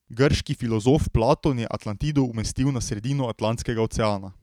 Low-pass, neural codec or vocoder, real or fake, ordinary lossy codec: 19.8 kHz; vocoder, 44.1 kHz, 128 mel bands every 512 samples, BigVGAN v2; fake; none